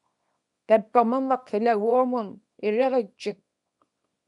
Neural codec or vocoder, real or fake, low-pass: codec, 24 kHz, 0.9 kbps, WavTokenizer, small release; fake; 10.8 kHz